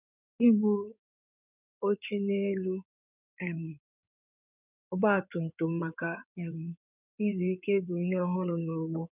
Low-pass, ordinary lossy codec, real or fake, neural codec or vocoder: 3.6 kHz; none; fake; codec, 16 kHz in and 24 kHz out, 2.2 kbps, FireRedTTS-2 codec